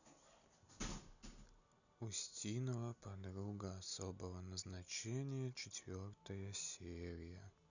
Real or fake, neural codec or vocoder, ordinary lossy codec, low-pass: real; none; none; 7.2 kHz